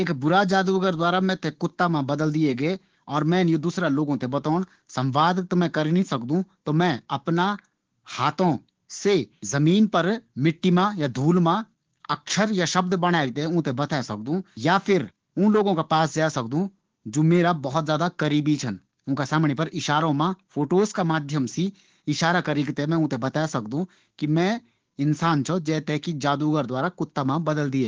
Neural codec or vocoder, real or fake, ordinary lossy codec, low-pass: codec, 16 kHz, 6 kbps, DAC; fake; Opus, 16 kbps; 7.2 kHz